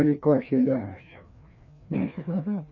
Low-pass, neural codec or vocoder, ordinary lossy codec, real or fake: 7.2 kHz; codec, 16 kHz, 2 kbps, FreqCodec, larger model; none; fake